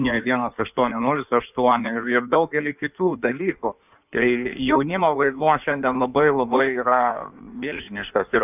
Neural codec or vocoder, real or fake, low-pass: codec, 16 kHz in and 24 kHz out, 1.1 kbps, FireRedTTS-2 codec; fake; 3.6 kHz